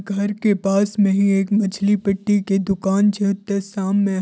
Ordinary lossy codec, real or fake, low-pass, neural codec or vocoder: none; real; none; none